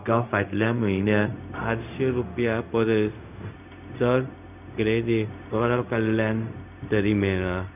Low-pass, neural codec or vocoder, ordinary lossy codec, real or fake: 3.6 kHz; codec, 16 kHz, 0.4 kbps, LongCat-Audio-Codec; none; fake